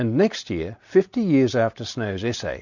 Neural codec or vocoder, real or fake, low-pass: none; real; 7.2 kHz